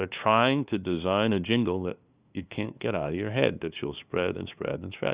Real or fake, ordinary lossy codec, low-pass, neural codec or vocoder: fake; Opus, 64 kbps; 3.6 kHz; codec, 16 kHz, 2 kbps, FunCodec, trained on LibriTTS, 25 frames a second